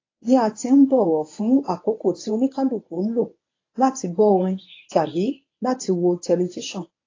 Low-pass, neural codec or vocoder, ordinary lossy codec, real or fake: 7.2 kHz; codec, 24 kHz, 0.9 kbps, WavTokenizer, medium speech release version 1; AAC, 32 kbps; fake